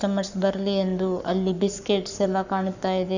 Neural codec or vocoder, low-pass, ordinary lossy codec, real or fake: codec, 44.1 kHz, 7.8 kbps, Pupu-Codec; 7.2 kHz; none; fake